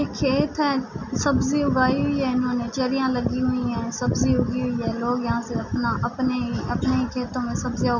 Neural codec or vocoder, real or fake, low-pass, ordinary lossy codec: none; real; 7.2 kHz; none